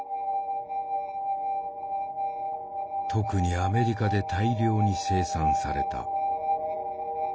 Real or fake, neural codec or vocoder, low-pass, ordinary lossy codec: real; none; none; none